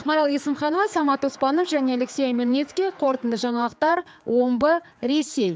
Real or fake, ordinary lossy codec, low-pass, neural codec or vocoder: fake; none; none; codec, 16 kHz, 4 kbps, X-Codec, HuBERT features, trained on general audio